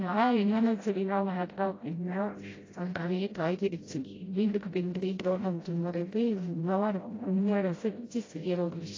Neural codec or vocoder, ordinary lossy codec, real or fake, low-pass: codec, 16 kHz, 0.5 kbps, FreqCodec, smaller model; AAC, 32 kbps; fake; 7.2 kHz